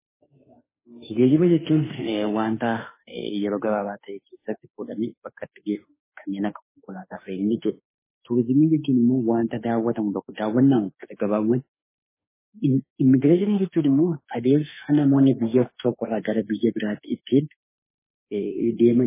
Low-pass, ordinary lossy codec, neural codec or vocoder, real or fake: 3.6 kHz; MP3, 16 kbps; autoencoder, 48 kHz, 32 numbers a frame, DAC-VAE, trained on Japanese speech; fake